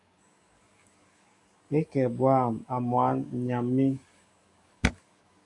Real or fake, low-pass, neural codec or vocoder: fake; 10.8 kHz; codec, 44.1 kHz, 7.8 kbps, DAC